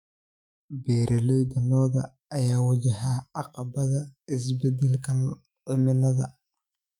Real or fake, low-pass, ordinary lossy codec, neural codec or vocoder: fake; 19.8 kHz; none; autoencoder, 48 kHz, 128 numbers a frame, DAC-VAE, trained on Japanese speech